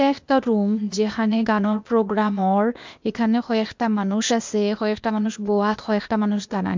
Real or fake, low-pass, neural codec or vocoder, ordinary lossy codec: fake; 7.2 kHz; codec, 16 kHz, 0.8 kbps, ZipCodec; MP3, 64 kbps